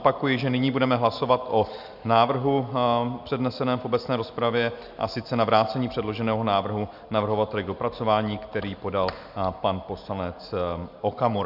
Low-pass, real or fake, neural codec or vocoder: 5.4 kHz; real; none